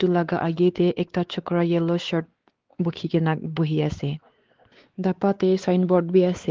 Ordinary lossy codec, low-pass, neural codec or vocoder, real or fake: Opus, 16 kbps; 7.2 kHz; codec, 16 kHz, 4 kbps, X-Codec, WavLM features, trained on Multilingual LibriSpeech; fake